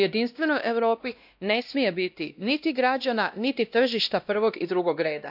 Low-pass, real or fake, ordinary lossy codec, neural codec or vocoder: 5.4 kHz; fake; none; codec, 16 kHz, 1 kbps, X-Codec, WavLM features, trained on Multilingual LibriSpeech